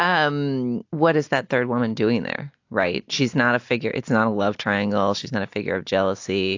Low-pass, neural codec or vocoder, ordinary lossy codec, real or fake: 7.2 kHz; none; AAC, 48 kbps; real